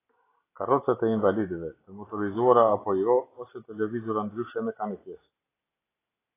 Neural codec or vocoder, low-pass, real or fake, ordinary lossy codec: none; 3.6 kHz; real; AAC, 16 kbps